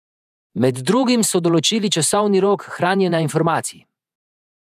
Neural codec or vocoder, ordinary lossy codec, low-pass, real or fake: vocoder, 48 kHz, 128 mel bands, Vocos; none; 14.4 kHz; fake